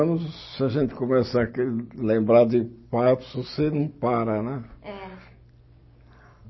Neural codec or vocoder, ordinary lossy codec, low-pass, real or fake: none; MP3, 24 kbps; 7.2 kHz; real